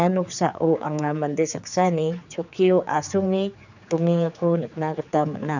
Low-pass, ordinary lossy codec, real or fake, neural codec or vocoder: 7.2 kHz; none; fake; codec, 16 kHz, 4 kbps, X-Codec, HuBERT features, trained on general audio